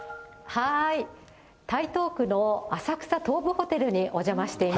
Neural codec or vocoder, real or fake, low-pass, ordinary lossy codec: none; real; none; none